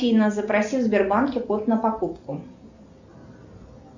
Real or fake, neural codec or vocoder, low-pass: real; none; 7.2 kHz